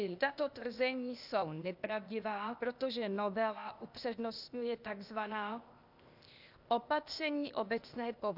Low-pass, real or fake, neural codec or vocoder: 5.4 kHz; fake; codec, 16 kHz, 0.8 kbps, ZipCodec